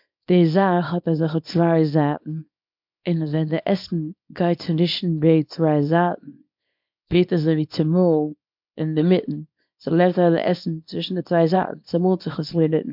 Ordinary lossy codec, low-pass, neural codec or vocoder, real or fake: MP3, 48 kbps; 5.4 kHz; codec, 24 kHz, 0.9 kbps, WavTokenizer, small release; fake